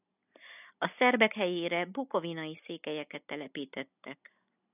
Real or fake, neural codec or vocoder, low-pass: real; none; 3.6 kHz